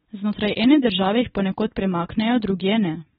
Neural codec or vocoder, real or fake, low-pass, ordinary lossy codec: none; real; 10.8 kHz; AAC, 16 kbps